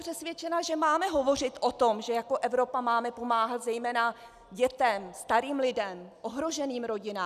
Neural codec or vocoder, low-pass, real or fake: none; 14.4 kHz; real